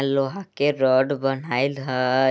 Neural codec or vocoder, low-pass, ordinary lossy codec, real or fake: none; none; none; real